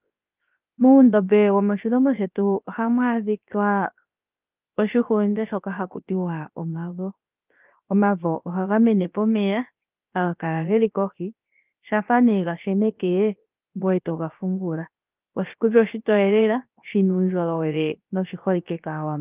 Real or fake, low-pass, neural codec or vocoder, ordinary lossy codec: fake; 3.6 kHz; codec, 16 kHz, 0.7 kbps, FocalCodec; Opus, 24 kbps